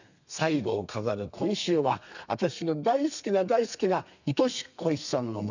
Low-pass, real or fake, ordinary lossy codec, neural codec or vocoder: 7.2 kHz; fake; none; codec, 32 kHz, 1.9 kbps, SNAC